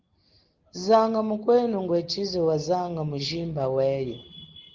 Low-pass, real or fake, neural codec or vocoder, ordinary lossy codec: 7.2 kHz; real; none; Opus, 16 kbps